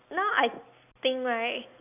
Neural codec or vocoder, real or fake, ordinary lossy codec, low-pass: none; real; none; 3.6 kHz